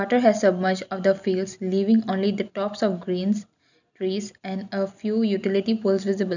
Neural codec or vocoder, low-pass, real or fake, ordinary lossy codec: none; 7.2 kHz; real; none